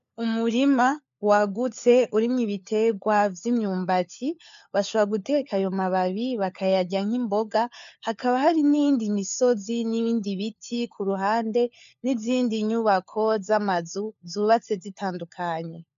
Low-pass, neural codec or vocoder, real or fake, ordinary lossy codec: 7.2 kHz; codec, 16 kHz, 4 kbps, FunCodec, trained on LibriTTS, 50 frames a second; fake; MP3, 64 kbps